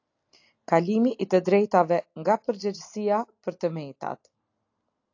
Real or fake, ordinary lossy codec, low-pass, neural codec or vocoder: real; AAC, 48 kbps; 7.2 kHz; none